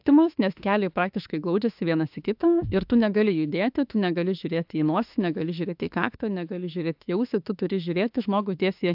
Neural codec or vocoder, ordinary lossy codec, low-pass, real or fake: autoencoder, 48 kHz, 32 numbers a frame, DAC-VAE, trained on Japanese speech; AAC, 48 kbps; 5.4 kHz; fake